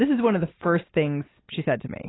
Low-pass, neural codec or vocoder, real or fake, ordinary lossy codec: 7.2 kHz; none; real; AAC, 16 kbps